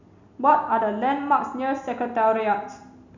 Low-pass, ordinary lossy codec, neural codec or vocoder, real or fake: 7.2 kHz; none; none; real